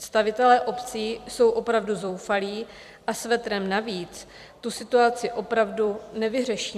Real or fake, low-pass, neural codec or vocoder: fake; 14.4 kHz; vocoder, 44.1 kHz, 128 mel bands every 256 samples, BigVGAN v2